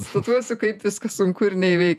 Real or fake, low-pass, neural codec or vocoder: fake; 14.4 kHz; vocoder, 48 kHz, 128 mel bands, Vocos